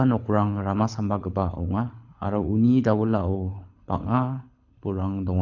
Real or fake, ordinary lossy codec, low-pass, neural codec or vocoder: fake; none; 7.2 kHz; codec, 24 kHz, 6 kbps, HILCodec